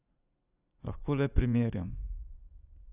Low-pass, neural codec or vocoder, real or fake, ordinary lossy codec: 3.6 kHz; vocoder, 44.1 kHz, 80 mel bands, Vocos; fake; none